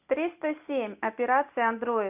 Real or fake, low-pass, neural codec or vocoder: real; 3.6 kHz; none